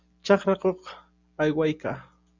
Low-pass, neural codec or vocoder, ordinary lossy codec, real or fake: 7.2 kHz; none; Opus, 64 kbps; real